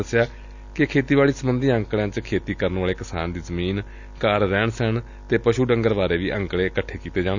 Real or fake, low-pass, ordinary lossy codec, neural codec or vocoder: real; 7.2 kHz; none; none